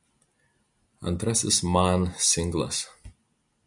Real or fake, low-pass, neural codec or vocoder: real; 10.8 kHz; none